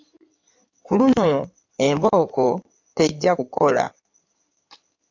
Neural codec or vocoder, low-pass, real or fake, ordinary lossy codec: codec, 16 kHz in and 24 kHz out, 2.2 kbps, FireRedTTS-2 codec; 7.2 kHz; fake; AAC, 48 kbps